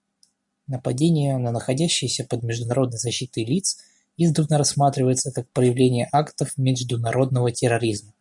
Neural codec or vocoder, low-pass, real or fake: none; 10.8 kHz; real